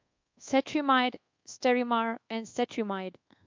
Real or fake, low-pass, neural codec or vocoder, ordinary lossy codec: fake; 7.2 kHz; codec, 24 kHz, 1.2 kbps, DualCodec; MP3, 48 kbps